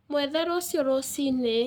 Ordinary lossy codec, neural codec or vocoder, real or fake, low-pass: none; codec, 44.1 kHz, 7.8 kbps, Pupu-Codec; fake; none